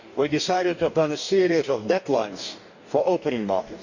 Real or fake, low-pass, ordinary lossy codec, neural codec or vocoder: fake; 7.2 kHz; none; codec, 44.1 kHz, 2.6 kbps, DAC